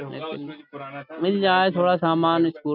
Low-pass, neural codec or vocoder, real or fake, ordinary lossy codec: 5.4 kHz; none; real; none